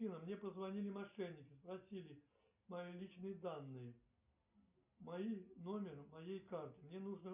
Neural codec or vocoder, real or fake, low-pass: none; real; 3.6 kHz